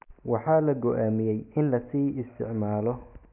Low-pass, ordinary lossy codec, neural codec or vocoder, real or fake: 3.6 kHz; none; none; real